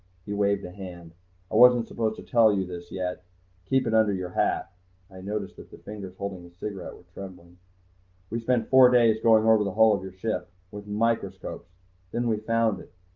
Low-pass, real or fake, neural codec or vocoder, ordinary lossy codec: 7.2 kHz; real; none; Opus, 24 kbps